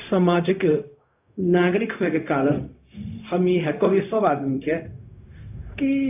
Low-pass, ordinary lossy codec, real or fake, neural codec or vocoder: 3.6 kHz; AAC, 32 kbps; fake; codec, 16 kHz, 0.4 kbps, LongCat-Audio-Codec